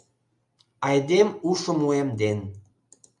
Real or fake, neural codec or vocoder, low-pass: real; none; 10.8 kHz